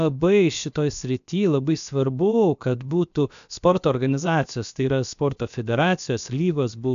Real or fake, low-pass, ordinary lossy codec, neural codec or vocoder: fake; 7.2 kHz; AAC, 96 kbps; codec, 16 kHz, about 1 kbps, DyCAST, with the encoder's durations